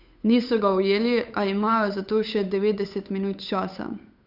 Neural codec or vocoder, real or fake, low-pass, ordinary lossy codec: codec, 16 kHz, 8 kbps, FunCodec, trained on Chinese and English, 25 frames a second; fake; 5.4 kHz; none